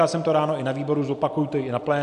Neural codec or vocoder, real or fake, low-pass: none; real; 10.8 kHz